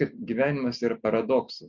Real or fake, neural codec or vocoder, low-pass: real; none; 7.2 kHz